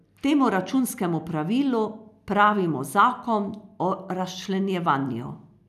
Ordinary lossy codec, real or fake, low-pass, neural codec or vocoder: none; real; 14.4 kHz; none